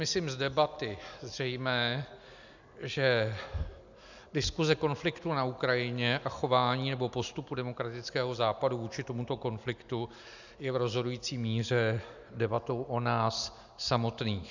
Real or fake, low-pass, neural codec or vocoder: real; 7.2 kHz; none